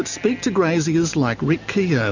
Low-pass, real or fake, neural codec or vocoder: 7.2 kHz; real; none